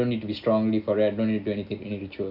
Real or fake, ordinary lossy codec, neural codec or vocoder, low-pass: real; none; none; 5.4 kHz